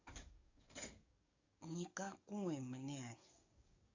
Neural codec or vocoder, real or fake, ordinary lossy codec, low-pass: codec, 44.1 kHz, 7.8 kbps, DAC; fake; AAC, 48 kbps; 7.2 kHz